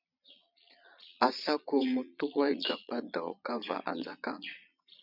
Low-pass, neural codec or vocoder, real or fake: 5.4 kHz; vocoder, 22.05 kHz, 80 mel bands, Vocos; fake